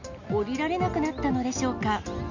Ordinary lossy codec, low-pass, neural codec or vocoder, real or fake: none; 7.2 kHz; none; real